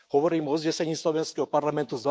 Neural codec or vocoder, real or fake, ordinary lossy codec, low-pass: codec, 16 kHz, 6 kbps, DAC; fake; none; none